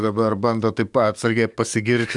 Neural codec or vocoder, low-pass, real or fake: codec, 44.1 kHz, 7.8 kbps, DAC; 10.8 kHz; fake